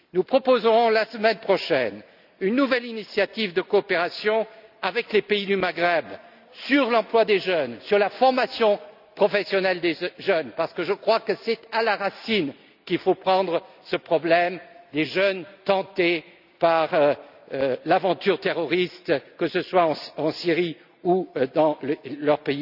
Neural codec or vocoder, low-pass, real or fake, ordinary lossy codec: none; 5.4 kHz; real; none